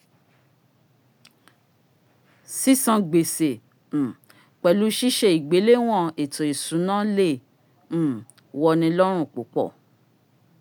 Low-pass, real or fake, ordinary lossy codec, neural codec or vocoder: none; real; none; none